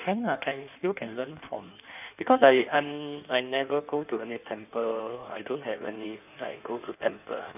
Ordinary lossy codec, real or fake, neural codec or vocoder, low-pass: none; fake; codec, 16 kHz in and 24 kHz out, 1.1 kbps, FireRedTTS-2 codec; 3.6 kHz